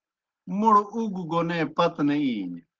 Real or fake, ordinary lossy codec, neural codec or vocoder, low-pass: real; Opus, 16 kbps; none; 7.2 kHz